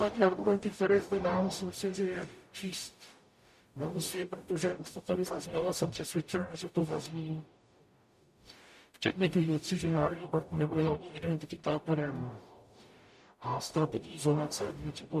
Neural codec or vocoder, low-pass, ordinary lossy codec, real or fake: codec, 44.1 kHz, 0.9 kbps, DAC; 14.4 kHz; MP3, 64 kbps; fake